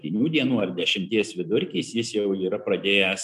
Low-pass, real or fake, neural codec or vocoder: 14.4 kHz; real; none